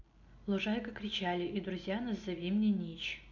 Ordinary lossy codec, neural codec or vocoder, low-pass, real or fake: none; none; 7.2 kHz; real